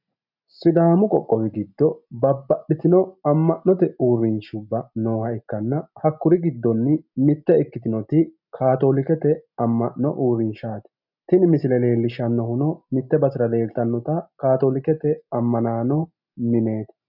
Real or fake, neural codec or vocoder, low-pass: real; none; 5.4 kHz